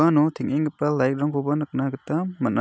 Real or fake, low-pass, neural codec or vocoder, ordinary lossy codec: real; none; none; none